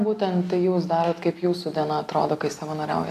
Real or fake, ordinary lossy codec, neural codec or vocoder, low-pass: real; AAC, 64 kbps; none; 14.4 kHz